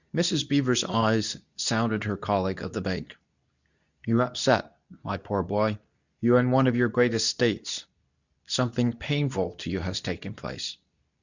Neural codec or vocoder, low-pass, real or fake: codec, 24 kHz, 0.9 kbps, WavTokenizer, medium speech release version 2; 7.2 kHz; fake